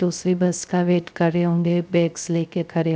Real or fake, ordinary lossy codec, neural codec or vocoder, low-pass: fake; none; codec, 16 kHz, 0.3 kbps, FocalCodec; none